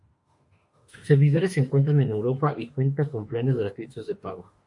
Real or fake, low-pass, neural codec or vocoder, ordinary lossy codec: fake; 10.8 kHz; autoencoder, 48 kHz, 32 numbers a frame, DAC-VAE, trained on Japanese speech; MP3, 48 kbps